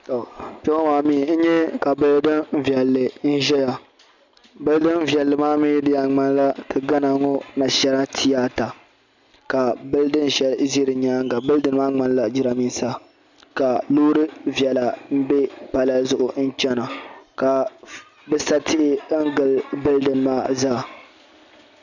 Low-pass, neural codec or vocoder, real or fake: 7.2 kHz; none; real